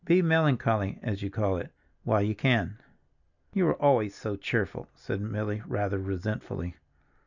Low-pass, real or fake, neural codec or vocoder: 7.2 kHz; real; none